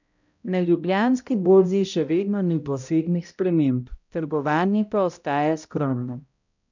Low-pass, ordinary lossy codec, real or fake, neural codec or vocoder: 7.2 kHz; none; fake; codec, 16 kHz, 0.5 kbps, X-Codec, HuBERT features, trained on balanced general audio